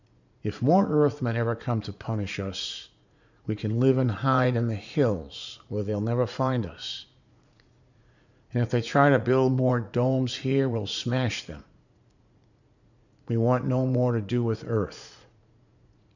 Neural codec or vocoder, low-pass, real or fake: vocoder, 44.1 kHz, 80 mel bands, Vocos; 7.2 kHz; fake